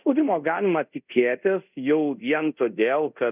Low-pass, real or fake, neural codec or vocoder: 3.6 kHz; fake; codec, 24 kHz, 0.5 kbps, DualCodec